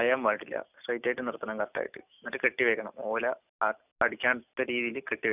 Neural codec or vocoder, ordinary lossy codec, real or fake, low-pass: none; none; real; 3.6 kHz